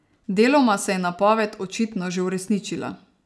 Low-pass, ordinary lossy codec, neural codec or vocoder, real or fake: none; none; none; real